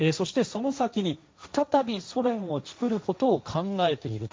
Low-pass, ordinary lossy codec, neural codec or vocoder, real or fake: none; none; codec, 16 kHz, 1.1 kbps, Voila-Tokenizer; fake